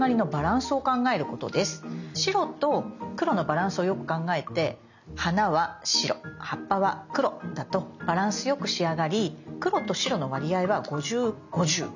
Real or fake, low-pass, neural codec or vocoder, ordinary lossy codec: real; 7.2 kHz; none; none